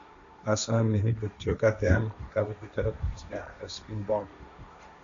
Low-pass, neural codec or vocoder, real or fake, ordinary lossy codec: 7.2 kHz; codec, 16 kHz, 1.1 kbps, Voila-Tokenizer; fake; AAC, 64 kbps